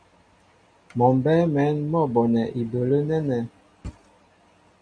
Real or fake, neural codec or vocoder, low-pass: real; none; 9.9 kHz